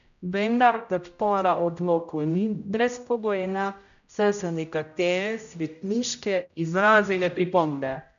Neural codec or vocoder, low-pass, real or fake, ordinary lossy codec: codec, 16 kHz, 0.5 kbps, X-Codec, HuBERT features, trained on general audio; 7.2 kHz; fake; none